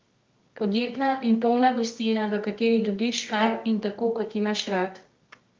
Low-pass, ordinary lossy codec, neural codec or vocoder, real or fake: 7.2 kHz; Opus, 32 kbps; codec, 24 kHz, 0.9 kbps, WavTokenizer, medium music audio release; fake